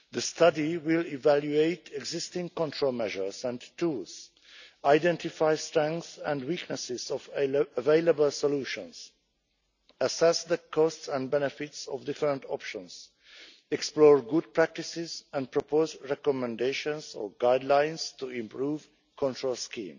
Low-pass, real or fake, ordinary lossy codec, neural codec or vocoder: 7.2 kHz; real; none; none